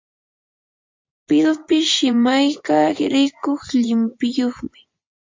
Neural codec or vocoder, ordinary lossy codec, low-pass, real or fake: vocoder, 24 kHz, 100 mel bands, Vocos; MP3, 48 kbps; 7.2 kHz; fake